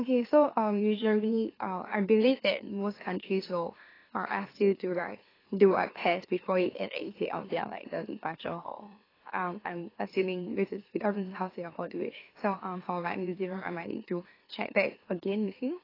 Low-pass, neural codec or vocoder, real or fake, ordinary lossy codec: 5.4 kHz; autoencoder, 44.1 kHz, a latent of 192 numbers a frame, MeloTTS; fake; AAC, 24 kbps